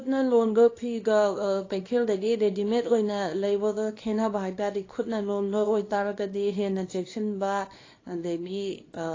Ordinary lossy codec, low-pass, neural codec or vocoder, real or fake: AAC, 32 kbps; 7.2 kHz; codec, 24 kHz, 0.9 kbps, WavTokenizer, small release; fake